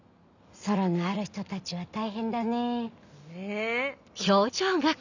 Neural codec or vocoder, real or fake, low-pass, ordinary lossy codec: none; real; 7.2 kHz; none